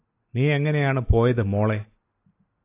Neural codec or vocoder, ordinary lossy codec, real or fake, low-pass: none; AAC, 24 kbps; real; 3.6 kHz